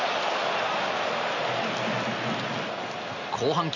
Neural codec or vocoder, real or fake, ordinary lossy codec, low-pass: none; real; none; 7.2 kHz